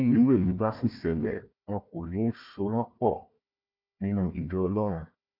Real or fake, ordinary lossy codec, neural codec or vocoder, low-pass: fake; none; codec, 16 kHz, 1 kbps, FunCodec, trained on Chinese and English, 50 frames a second; 5.4 kHz